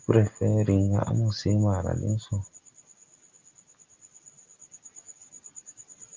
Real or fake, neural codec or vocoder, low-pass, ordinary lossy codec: real; none; 7.2 kHz; Opus, 32 kbps